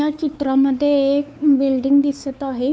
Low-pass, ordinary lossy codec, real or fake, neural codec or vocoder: none; none; fake; codec, 16 kHz, 4 kbps, X-Codec, WavLM features, trained on Multilingual LibriSpeech